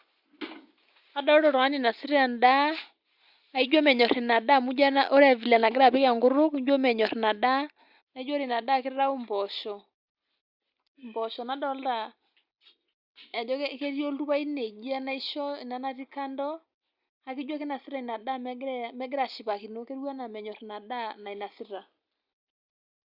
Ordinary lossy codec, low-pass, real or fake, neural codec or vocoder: Opus, 64 kbps; 5.4 kHz; real; none